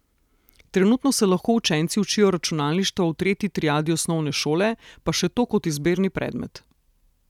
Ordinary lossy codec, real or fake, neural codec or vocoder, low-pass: none; real; none; 19.8 kHz